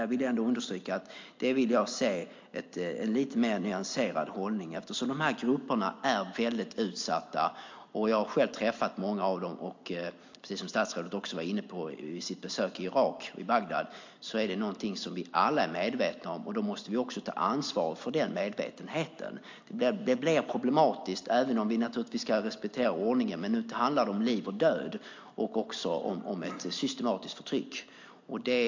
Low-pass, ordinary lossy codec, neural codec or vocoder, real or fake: 7.2 kHz; MP3, 48 kbps; none; real